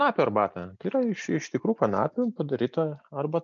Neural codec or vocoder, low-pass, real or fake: none; 7.2 kHz; real